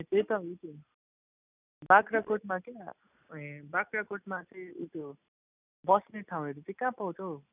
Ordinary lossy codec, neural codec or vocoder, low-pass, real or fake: none; none; 3.6 kHz; real